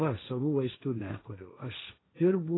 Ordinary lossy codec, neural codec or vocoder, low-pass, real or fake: AAC, 16 kbps; codec, 16 kHz, 1.1 kbps, Voila-Tokenizer; 7.2 kHz; fake